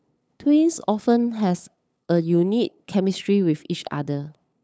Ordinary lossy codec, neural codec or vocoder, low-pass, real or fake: none; codec, 16 kHz, 8 kbps, FunCodec, trained on LibriTTS, 25 frames a second; none; fake